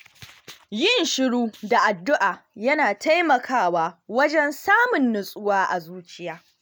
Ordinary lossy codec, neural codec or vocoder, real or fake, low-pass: none; none; real; none